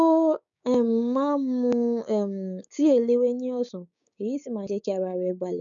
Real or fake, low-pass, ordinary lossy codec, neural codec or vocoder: fake; 7.2 kHz; none; codec, 16 kHz, 6 kbps, DAC